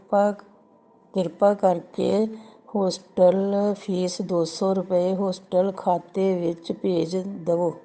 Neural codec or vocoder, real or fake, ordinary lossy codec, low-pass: codec, 16 kHz, 8 kbps, FunCodec, trained on Chinese and English, 25 frames a second; fake; none; none